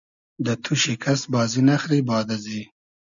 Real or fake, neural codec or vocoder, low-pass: real; none; 7.2 kHz